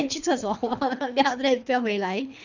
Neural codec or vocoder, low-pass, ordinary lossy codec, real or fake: codec, 24 kHz, 3 kbps, HILCodec; 7.2 kHz; none; fake